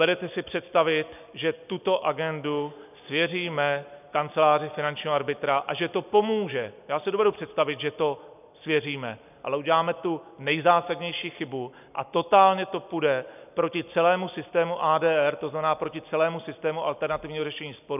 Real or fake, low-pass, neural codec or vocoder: real; 3.6 kHz; none